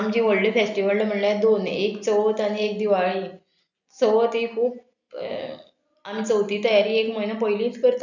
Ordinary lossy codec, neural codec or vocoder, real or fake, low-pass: none; none; real; 7.2 kHz